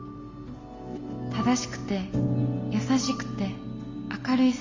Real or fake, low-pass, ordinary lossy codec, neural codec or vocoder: real; 7.2 kHz; Opus, 32 kbps; none